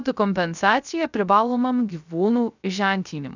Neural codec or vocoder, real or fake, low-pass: codec, 16 kHz, 0.3 kbps, FocalCodec; fake; 7.2 kHz